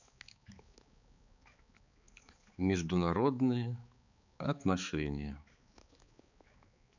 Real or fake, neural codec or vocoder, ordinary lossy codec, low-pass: fake; codec, 16 kHz, 4 kbps, X-Codec, HuBERT features, trained on balanced general audio; none; 7.2 kHz